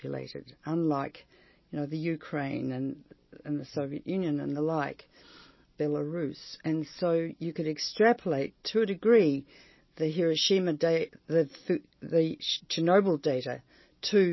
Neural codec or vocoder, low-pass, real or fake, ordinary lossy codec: none; 7.2 kHz; real; MP3, 24 kbps